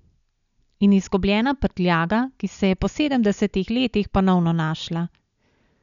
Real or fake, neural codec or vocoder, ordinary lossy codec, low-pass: real; none; none; 7.2 kHz